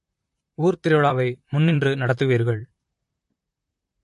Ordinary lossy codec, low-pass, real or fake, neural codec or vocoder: MP3, 48 kbps; 14.4 kHz; fake; vocoder, 44.1 kHz, 128 mel bands, Pupu-Vocoder